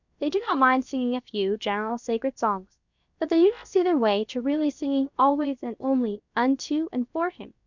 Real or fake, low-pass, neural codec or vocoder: fake; 7.2 kHz; codec, 16 kHz, 0.7 kbps, FocalCodec